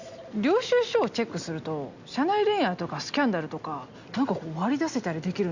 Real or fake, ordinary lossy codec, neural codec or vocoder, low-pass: real; Opus, 64 kbps; none; 7.2 kHz